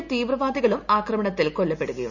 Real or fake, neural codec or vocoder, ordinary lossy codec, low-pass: real; none; none; 7.2 kHz